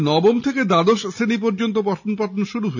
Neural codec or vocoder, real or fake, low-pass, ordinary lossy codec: none; real; 7.2 kHz; none